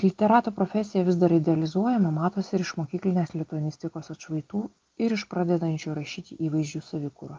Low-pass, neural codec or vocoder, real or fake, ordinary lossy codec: 7.2 kHz; none; real; Opus, 24 kbps